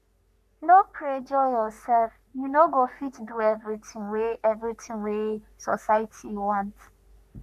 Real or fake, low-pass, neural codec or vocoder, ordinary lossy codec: fake; 14.4 kHz; codec, 44.1 kHz, 3.4 kbps, Pupu-Codec; none